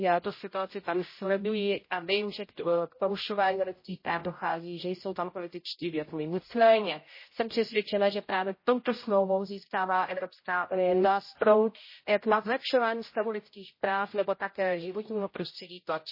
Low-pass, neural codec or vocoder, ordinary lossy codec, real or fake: 5.4 kHz; codec, 16 kHz, 0.5 kbps, X-Codec, HuBERT features, trained on general audio; MP3, 24 kbps; fake